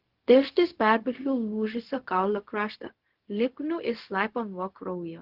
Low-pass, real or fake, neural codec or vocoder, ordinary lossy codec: 5.4 kHz; fake; codec, 16 kHz, 0.4 kbps, LongCat-Audio-Codec; Opus, 16 kbps